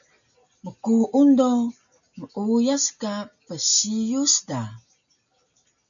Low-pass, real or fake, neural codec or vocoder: 7.2 kHz; real; none